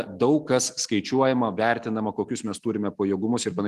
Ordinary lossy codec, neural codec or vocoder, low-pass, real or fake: Opus, 24 kbps; none; 14.4 kHz; real